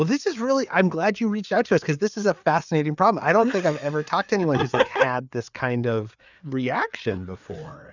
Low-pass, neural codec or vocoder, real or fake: 7.2 kHz; codec, 16 kHz, 4 kbps, FreqCodec, larger model; fake